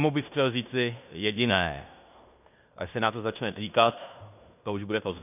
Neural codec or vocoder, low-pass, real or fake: codec, 16 kHz in and 24 kHz out, 0.9 kbps, LongCat-Audio-Codec, fine tuned four codebook decoder; 3.6 kHz; fake